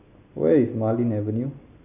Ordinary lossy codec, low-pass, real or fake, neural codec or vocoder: none; 3.6 kHz; real; none